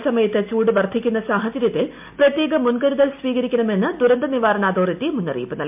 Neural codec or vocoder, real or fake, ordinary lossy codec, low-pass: none; real; MP3, 32 kbps; 3.6 kHz